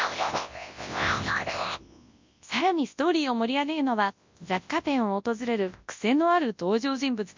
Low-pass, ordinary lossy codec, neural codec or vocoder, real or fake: 7.2 kHz; MP3, 64 kbps; codec, 24 kHz, 0.9 kbps, WavTokenizer, large speech release; fake